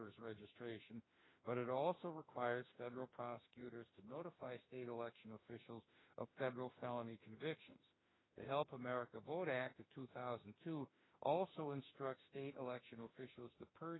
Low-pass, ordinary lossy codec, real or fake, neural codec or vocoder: 7.2 kHz; AAC, 16 kbps; fake; autoencoder, 48 kHz, 32 numbers a frame, DAC-VAE, trained on Japanese speech